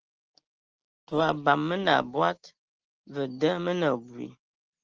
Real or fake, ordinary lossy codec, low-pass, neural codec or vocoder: real; Opus, 16 kbps; 7.2 kHz; none